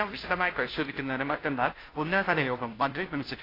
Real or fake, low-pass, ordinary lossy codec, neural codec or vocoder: fake; 5.4 kHz; AAC, 24 kbps; codec, 16 kHz, 0.5 kbps, FunCodec, trained on Chinese and English, 25 frames a second